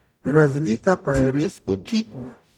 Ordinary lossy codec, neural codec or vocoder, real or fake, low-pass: none; codec, 44.1 kHz, 0.9 kbps, DAC; fake; 19.8 kHz